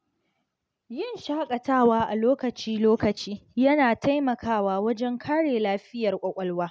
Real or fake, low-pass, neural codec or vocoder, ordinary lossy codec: real; none; none; none